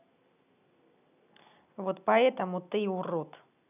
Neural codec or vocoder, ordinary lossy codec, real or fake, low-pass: none; none; real; 3.6 kHz